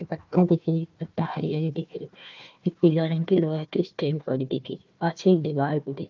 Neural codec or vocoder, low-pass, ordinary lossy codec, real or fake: codec, 16 kHz, 1 kbps, FunCodec, trained on Chinese and English, 50 frames a second; 7.2 kHz; Opus, 24 kbps; fake